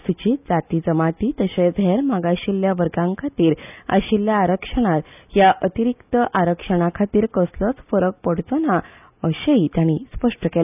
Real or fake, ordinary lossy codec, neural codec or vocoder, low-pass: real; none; none; 3.6 kHz